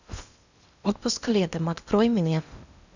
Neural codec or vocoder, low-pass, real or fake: codec, 16 kHz in and 24 kHz out, 0.8 kbps, FocalCodec, streaming, 65536 codes; 7.2 kHz; fake